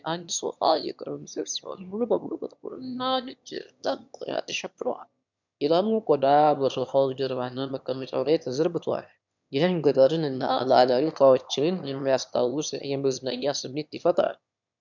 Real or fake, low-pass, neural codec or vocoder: fake; 7.2 kHz; autoencoder, 22.05 kHz, a latent of 192 numbers a frame, VITS, trained on one speaker